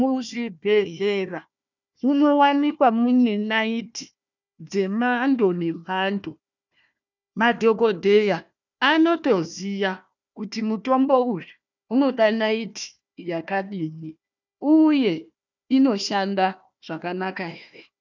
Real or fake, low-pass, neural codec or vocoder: fake; 7.2 kHz; codec, 16 kHz, 1 kbps, FunCodec, trained on Chinese and English, 50 frames a second